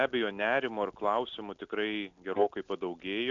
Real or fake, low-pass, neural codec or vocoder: real; 7.2 kHz; none